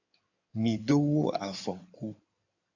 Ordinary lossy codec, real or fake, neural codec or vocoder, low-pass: AAC, 48 kbps; fake; codec, 16 kHz in and 24 kHz out, 2.2 kbps, FireRedTTS-2 codec; 7.2 kHz